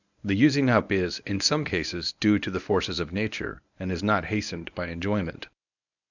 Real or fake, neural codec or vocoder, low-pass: fake; codec, 24 kHz, 0.9 kbps, WavTokenizer, medium speech release version 1; 7.2 kHz